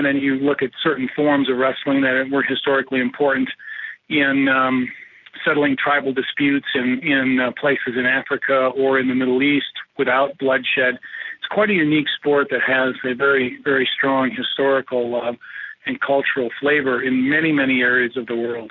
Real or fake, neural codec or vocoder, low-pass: real; none; 7.2 kHz